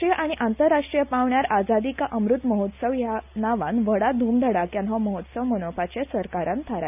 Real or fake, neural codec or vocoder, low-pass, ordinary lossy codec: real; none; 3.6 kHz; none